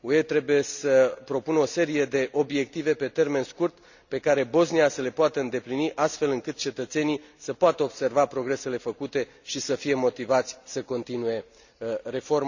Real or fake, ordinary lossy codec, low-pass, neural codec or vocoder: real; none; 7.2 kHz; none